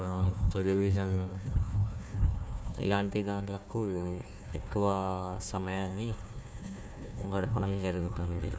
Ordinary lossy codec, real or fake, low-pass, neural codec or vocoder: none; fake; none; codec, 16 kHz, 1 kbps, FunCodec, trained on Chinese and English, 50 frames a second